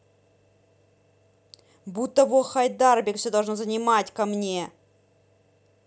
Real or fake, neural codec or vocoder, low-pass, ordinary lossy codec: real; none; none; none